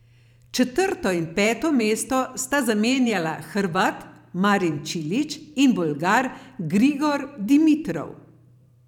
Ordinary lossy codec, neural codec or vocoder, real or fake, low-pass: none; vocoder, 44.1 kHz, 128 mel bands every 512 samples, BigVGAN v2; fake; 19.8 kHz